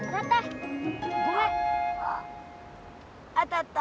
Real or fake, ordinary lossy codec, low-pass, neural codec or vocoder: real; none; none; none